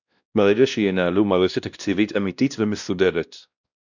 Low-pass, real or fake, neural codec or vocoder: 7.2 kHz; fake; codec, 16 kHz, 1 kbps, X-Codec, WavLM features, trained on Multilingual LibriSpeech